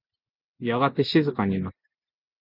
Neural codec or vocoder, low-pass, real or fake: none; 5.4 kHz; real